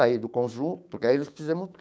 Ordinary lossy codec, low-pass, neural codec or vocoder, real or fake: none; none; codec, 16 kHz, 6 kbps, DAC; fake